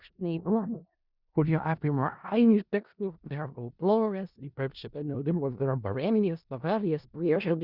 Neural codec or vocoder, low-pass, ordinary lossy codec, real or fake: codec, 16 kHz in and 24 kHz out, 0.4 kbps, LongCat-Audio-Codec, four codebook decoder; 5.4 kHz; Opus, 64 kbps; fake